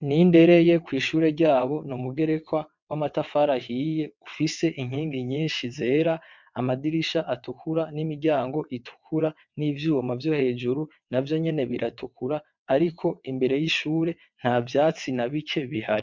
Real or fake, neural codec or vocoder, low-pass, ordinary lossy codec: fake; vocoder, 22.05 kHz, 80 mel bands, WaveNeXt; 7.2 kHz; MP3, 64 kbps